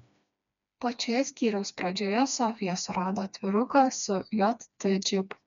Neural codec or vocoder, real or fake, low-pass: codec, 16 kHz, 2 kbps, FreqCodec, smaller model; fake; 7.2 kHz